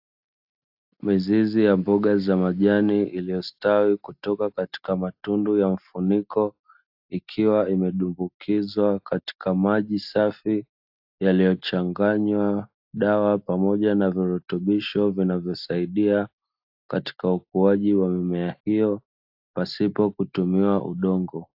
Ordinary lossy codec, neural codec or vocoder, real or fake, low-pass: AAC, 48 kbps; none; real; 5.4 kHz